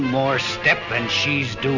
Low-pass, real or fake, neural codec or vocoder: 7.2 kHz; real; none